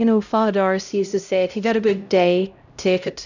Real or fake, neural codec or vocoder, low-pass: fake; codec, 16 kHz, 0.5 kbps, X-Codec, HuBERT features, trained on LibriSpeech; 7.2 kHz